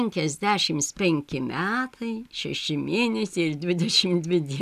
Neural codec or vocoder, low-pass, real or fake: none; 14.4 kHz; real